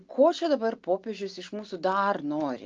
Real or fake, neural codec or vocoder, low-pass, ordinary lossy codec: real; none; 7.2 kHz; Opus, 24 kbps